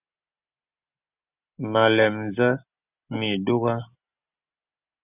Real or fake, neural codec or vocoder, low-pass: real; none; 3.6 kHz